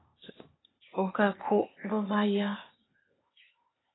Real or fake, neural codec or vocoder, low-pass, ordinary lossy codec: fake; codec, 16 kHz, 0.8 kbps, ZipCodec; 7.2 kHz; AAC, 16 kbps